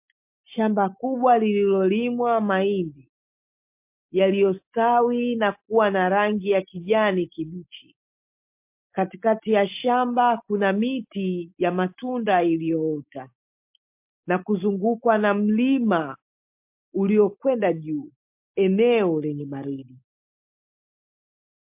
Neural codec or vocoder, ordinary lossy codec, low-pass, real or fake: none; MP3, 32 kbps; 3.6 kHz; real